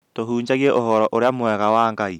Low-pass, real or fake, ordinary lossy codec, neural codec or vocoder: 19.8 kHz; real; none; none